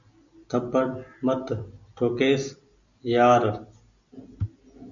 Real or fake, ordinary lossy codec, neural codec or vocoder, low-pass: real; MP3, 96 kbps; none; 7.2 kHz